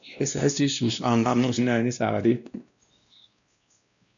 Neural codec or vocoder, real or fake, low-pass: codec, 16 kHz, 1 kbps, X-Codec, WavLM features, trained on Multilingual LibriSpeech; fake; 7.2 kHz